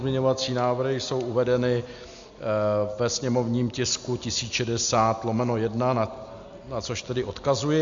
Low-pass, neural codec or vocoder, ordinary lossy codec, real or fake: 7.2 kHz; none; MP3, 64 kbps; real